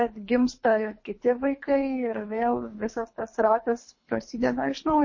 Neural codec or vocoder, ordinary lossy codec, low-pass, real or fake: codec, 24 kHz, 3 kbps, HILCodec; MP3, 32 kbps; 7.2 kHz; fake